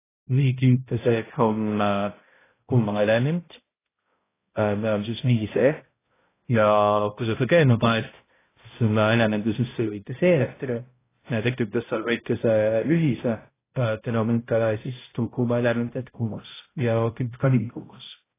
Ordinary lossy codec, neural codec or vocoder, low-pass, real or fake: AAC, 16 kbps; codec, 16 kHz, 0.5 kbps, X-Codec, HuBERT features, trained on balanced general audio; 3.6 kHz; fake